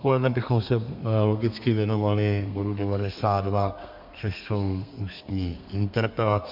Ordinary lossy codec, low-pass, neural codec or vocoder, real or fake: MP3, 48 kbps; 5.4 kHz; codec, 32 kHz, 1.9 kbps, SNAC; fake